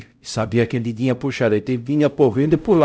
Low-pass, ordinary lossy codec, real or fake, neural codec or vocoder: none; none; fake; codec, 16 kHz, 0.5 kbps, X-Codec, HuBERT features, trained on LibriSpeech